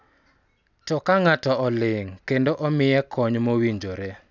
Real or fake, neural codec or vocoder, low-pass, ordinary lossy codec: real; none; 7.2 kHz; none